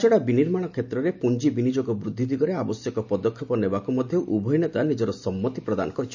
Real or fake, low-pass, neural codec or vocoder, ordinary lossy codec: real; 7.2 kHz; none; none